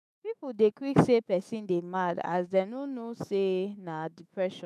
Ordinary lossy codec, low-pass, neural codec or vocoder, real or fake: none; 14.4 kHz; autoencoder, 48 kHz, 128 numbers a frame, DAC-VAE, trained on Japanese speech; fake